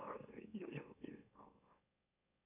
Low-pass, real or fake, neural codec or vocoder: 3.6 kHz; fake; autoencoder, 44.1 kHz, a latent of 192 numbers a frame, MeloTTS